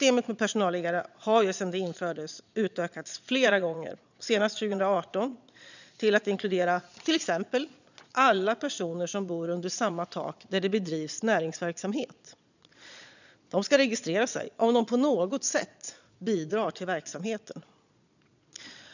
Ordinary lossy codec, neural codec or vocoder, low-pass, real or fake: none; vocoder, 22.05 kHz, 80 mel bands, Vocos; 7.2 kHz; fake